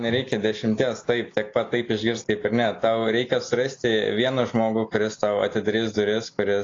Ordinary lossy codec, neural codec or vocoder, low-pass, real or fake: AAC, 32 kbps; none; 7.2 kHz; real